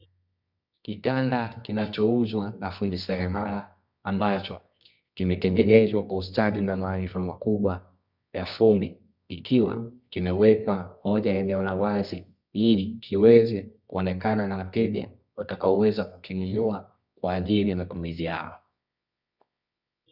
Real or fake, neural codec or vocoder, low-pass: fake; codec, 24 kHz, 0.9 kbps, WavTokenizer, medium music audio release; 5.4 kHz